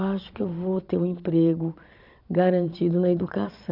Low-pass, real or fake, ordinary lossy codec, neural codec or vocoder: 5.4 kHz; fake; none; vocoder, 22.05 kHz, 80 mel bands, Vocos